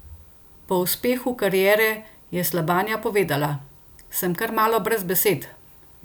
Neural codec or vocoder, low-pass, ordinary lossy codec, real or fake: none; none; none; real